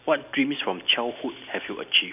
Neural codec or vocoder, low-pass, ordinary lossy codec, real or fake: none; 3.6 kHz; none; real